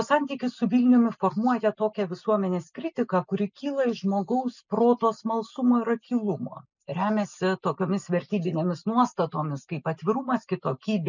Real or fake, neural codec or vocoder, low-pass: real; none; 7.2 kHz